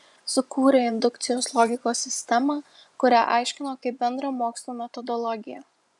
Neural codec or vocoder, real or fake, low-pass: vocoder, 24 kHz, 100 mel bands, Vocos; fake; 10.8 kHz